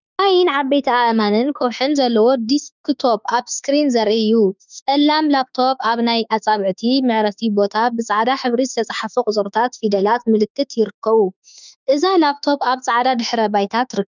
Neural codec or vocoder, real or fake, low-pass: autoencoder, 48 kHz, 32 numbers a frame, DAC-VAE, trained on Japanese speech; fake; 7.2 kHz